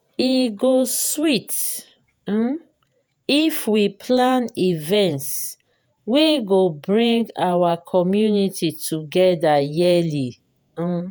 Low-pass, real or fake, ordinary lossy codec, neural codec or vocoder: none; fake; none; vocoder, 48 kHz, 128 mel bands, Vocos